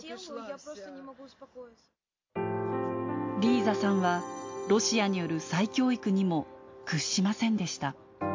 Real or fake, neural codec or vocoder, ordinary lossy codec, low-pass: real; none; MP3, 48 kbps; 7.2 kHz